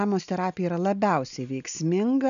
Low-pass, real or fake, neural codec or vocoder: 7.2 kHz; real; none